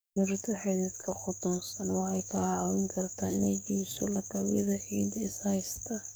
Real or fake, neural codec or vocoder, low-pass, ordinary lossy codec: fake; codec, 44.1 kHz, 7.8 kbps, DAC; none; none